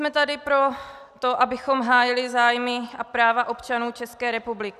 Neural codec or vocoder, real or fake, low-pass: none; real; 14.4 kHz